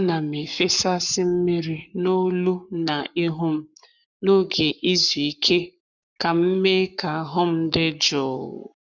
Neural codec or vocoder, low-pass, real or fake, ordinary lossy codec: codec, 44.1 kHz, 7.8 kbps, Pupu-Codec; 7.2 kHz; fake; none